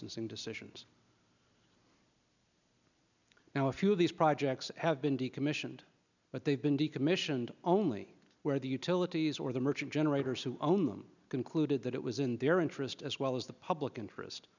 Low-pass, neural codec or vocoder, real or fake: 7.2 kHz; none; real